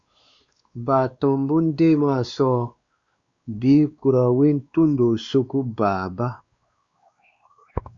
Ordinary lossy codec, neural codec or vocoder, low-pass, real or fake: Opus, 64 kbps; codec, 16 kHz, 2 kbps, X-Codec, WavLM features, trained on Multilingual LibriSpeech; 7.2 kHz; fake